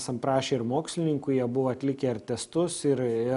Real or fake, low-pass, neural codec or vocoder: real; 10.8 kHz; none